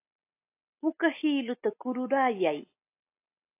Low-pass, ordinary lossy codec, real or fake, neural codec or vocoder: 3.6 kHz; AAC, 24 kbps; real; none